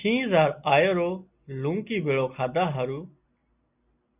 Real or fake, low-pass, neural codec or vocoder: real; 3.6 kHz; none